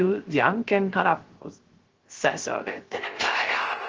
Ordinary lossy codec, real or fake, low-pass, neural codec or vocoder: Opus, 16 kbps; fake; 7.2 kHz; codec, 16 kHz, 0.3 kbps, FocalCodec